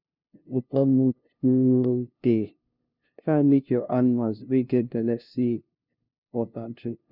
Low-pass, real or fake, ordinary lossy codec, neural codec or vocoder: 5.4 kHz; fake; none; codec, 16 kHz, 0.5 kbps, FunCodec, trained on LibriTTS, 25 frames a second